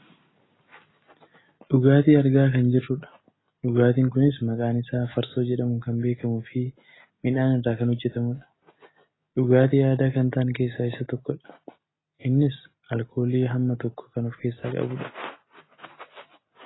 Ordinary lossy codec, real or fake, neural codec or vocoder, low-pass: AAC, 16 kbps; real; none; 7.2 kHz